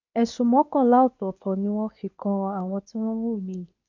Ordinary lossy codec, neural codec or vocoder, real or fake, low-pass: none; codec, 24 kHz, 0.9 kbps, WavTokenizer, medium speech release version 2; fake; 7.2 kHz